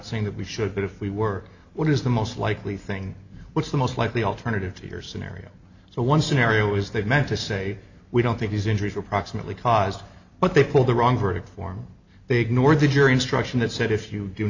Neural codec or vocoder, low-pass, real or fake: none; 7.2 kHz; real